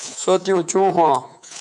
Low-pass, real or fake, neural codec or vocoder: 10.8 kHz; fake; codec, 24 kHz, 3.1 kbps, DualCodec